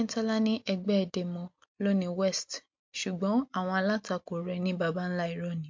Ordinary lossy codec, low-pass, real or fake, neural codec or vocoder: MP3, 48 kbps; 7.2 kHz; real; none